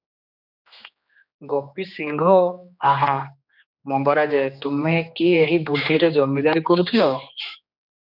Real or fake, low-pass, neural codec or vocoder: fake; 5.4 kHz; codec, 16 kHz, 2 kbps, X-Codec, HuBERT features, trained on general audio